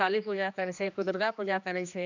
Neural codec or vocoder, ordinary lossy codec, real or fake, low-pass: codec, 16 kHz, 2 kbps, X-Codec, HuBERT features, trained on general audio; none; fake; 7.2 kHz